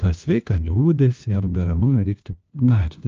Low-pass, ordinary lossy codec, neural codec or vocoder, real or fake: 7.2 kHz; Opus, 24 kbps; codec, 16 kHz, 1 kbps, FunCodec, trained on LibriTTS, 50 frames a second; fake